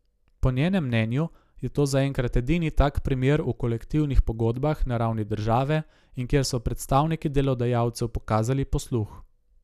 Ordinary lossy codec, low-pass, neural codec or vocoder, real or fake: none; 14.4 kHz; none; real